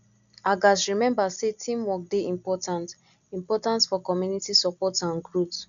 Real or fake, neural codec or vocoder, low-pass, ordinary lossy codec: real; none; 7.2 kHz; MP3, 96 kbps